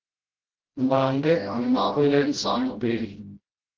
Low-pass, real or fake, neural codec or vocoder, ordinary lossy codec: 7.2 kHz; fake; codec, 16 kHz, 0.5 kbps, FreqCodec, smaller model; Opus, 16 kbps